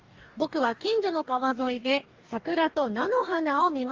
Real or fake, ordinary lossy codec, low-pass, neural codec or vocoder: fake; Opus, 32 kbps; 7.2 kHz; codec, 44.1 kHz, 2.6 kbps, DAC